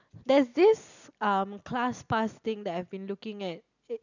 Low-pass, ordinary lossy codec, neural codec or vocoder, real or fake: 7.2 kHz; none; none; real